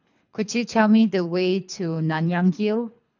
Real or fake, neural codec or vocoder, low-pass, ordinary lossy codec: fake; codec, 24 kHz, 3 kbps, HILCodec; 7.2 kHz; none